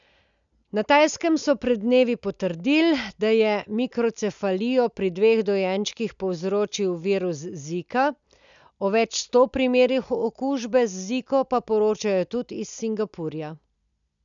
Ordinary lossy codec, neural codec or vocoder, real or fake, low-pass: none; none; real; 7.2 kHz